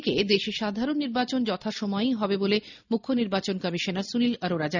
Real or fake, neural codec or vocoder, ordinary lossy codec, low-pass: real; none; none; 7.2 kHz